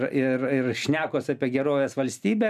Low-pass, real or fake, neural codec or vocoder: 14.4 kHz; real; none